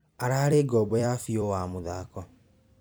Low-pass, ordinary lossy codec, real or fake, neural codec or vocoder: none; none; fake; vocoder, 44.1 kHz, 128 mel bands every 256 samples, BigVGAN v2